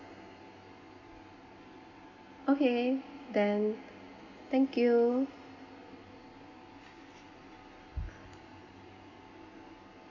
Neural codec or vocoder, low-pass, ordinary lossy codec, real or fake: none; 7.2 kHz; none; real